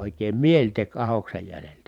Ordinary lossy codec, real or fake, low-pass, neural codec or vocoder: none; fake; 19.8 kHz; vocoder, 44.1 kHz, 128 mel bands every 512 samples, BigVGAN v2